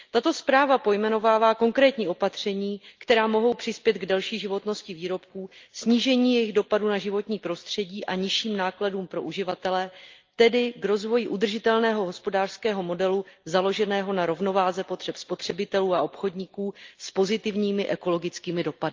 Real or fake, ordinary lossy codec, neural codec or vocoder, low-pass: real; Opus, 24 kbps; none; 7.2 kHz